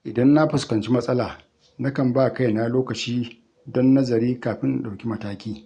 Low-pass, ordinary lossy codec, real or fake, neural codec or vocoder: 9.9 kHz; none; real; none